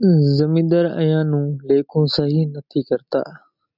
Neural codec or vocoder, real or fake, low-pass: none; real; 5.4 kHz